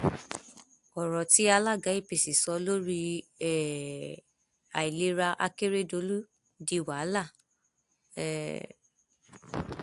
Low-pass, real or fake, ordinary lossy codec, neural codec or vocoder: 10.8 kHz; real; AAC, 64 kbps; none